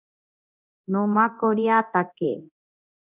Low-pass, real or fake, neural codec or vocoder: 3.6 kHz; fake; codec, 24 kHz, 0.9 kbps, DualCodec